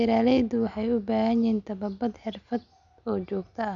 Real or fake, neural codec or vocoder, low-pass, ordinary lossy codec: real; none; 7.2 kHz; none